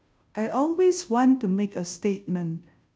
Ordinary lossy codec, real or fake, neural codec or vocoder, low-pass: none; fake; codec, 16 kHz, 0.5 kbps, FunCodec, trained on Chinese and English, 25 frames a second; none